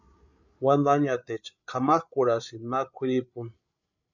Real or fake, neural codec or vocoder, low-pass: fake; codec, 16 kHz, 8 kbps, FreqCodec, larger model; 7.2 kHz